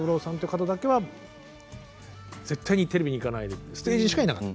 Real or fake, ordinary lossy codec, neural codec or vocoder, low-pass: real; none; none; none